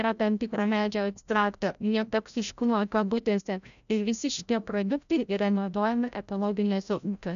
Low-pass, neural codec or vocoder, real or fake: 7.2 kHz; codec, 16 kHz, 0.5 kbps, FreqCodec, larger model; fake